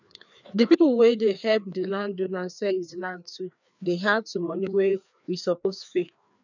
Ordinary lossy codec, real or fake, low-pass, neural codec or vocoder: none; fake; 7.2 kHz; codec, 16 kHz, 2 kbps, FreqCodec, larger model